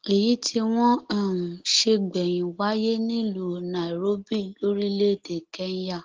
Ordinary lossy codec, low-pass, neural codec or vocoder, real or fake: Opus, 16 kbps; 7.2 kHz; none; real